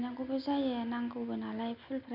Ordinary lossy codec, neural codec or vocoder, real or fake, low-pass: none; none; real; 5.4 kHz